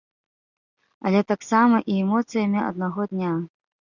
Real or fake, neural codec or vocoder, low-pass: real; none; 7.2 kHz